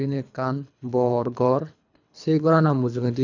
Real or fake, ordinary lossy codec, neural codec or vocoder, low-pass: fake; none; codec, 24 kHz, 3 kbps, HILCodec; 7.2 kHz